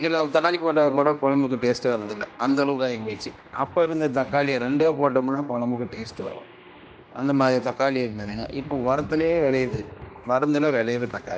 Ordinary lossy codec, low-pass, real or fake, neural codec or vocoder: none; none; fake; codec, 16 kHz, 1 kbps, X-Codec, HuBERT features, trained on general audio